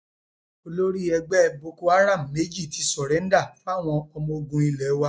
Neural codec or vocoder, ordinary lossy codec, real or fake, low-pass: none; none; real; none